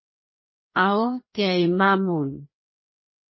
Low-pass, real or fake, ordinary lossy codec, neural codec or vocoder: 7.2 kHz; fake; MP3, 24 kbps; codec, 16 kHz, 1 kbps, FreqCodec, larger model